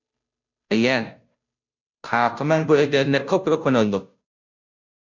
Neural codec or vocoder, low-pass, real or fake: codec, 16 kHz, 0.5 kbps, FunCodec, trained on Chinese and English, 25 frames a second; 7.2 kHz; fake